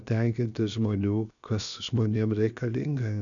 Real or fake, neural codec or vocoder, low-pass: fake; codec, 16 kHz, about 1 kbps, DyCAST, with the encoder's durations; 7.2 kHz